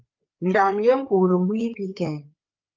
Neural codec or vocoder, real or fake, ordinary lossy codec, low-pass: codec, 16 kHz, 4 kbps, FreqCodec, larger model; fake; Opus, 24 kbps; 7.2 kHz